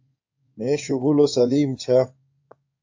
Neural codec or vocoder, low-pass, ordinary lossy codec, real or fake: codec, 16 kHz in and 24 kHz out, 2.2 kbps, FireRedTTS-2 codec; 7.2 kHz; AAC, 48 kbps; fake